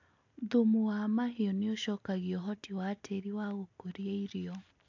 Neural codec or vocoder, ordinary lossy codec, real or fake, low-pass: none; AAC, 48 kbps; real; 7.2 kHz